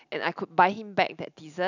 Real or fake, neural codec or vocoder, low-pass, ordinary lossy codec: real; none; 7.2 kHz; none